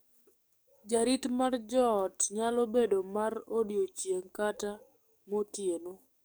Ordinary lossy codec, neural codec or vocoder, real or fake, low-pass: none; codec, 44.1 kHz, 7.8 kbps, DAC; fake; none